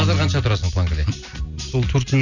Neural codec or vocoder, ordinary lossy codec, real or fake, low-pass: none; none; real; 7.2 kHz